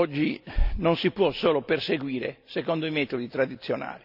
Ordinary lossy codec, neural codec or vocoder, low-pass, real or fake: none; none; 5.4 kHz; real